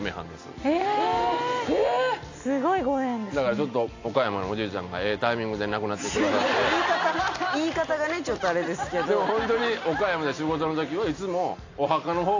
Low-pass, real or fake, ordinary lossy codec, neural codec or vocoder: 7.2 kHz; real; AAC, 48 kbps; none